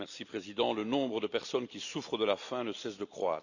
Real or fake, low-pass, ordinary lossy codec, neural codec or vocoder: fake; 7.2 kHz; none; vocoder, 44.1 kHz, 128 mel bands every 256 samples, BigVGAN v2